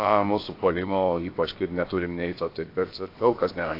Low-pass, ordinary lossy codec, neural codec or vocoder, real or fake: 5.4 kHz; AAC, 32 kbps; codec, 16 kHz, about 1 kbps, DyCAST, with the encoder's durations; fake